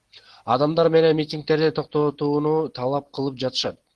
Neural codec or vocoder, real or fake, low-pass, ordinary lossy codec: none; real; 10.8 kHz; Opus, 16 kbps